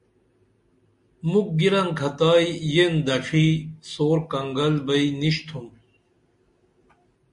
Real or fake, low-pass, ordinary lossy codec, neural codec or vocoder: real; 10.8 kHz; MP3, 64 kbps; none